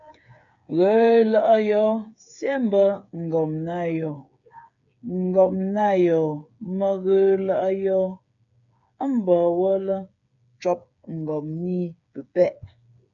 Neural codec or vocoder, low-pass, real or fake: codec, 16 kHz, 8 kbps, FreqCodec, smaller model; 7.2 kHz; fake